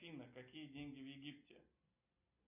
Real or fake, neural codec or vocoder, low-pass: real; none; 3.6 kHz